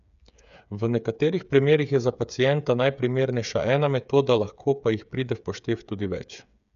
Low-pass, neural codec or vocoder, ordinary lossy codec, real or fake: 7.2 kHz; codec, 16 kHz, 8 kbps, FreqCodec, smaller model; none; fake